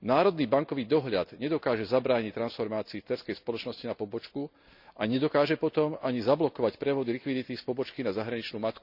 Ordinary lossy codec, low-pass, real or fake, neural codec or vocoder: none; 5.4 kHz; real; none